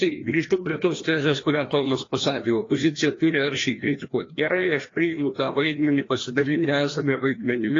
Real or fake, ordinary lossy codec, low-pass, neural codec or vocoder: fake; AAC, 32 kbps; 7.2 kHz; codec, 16 kHz, 1 kbps, FreqCodec, larger model